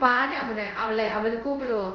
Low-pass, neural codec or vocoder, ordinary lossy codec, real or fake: 7.2 kHz; codec, 24 kHz, 0.5 kbps, DualCodec; none; fake